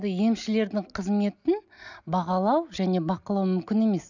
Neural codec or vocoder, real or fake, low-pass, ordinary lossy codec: none; real; 7.2 kHz; none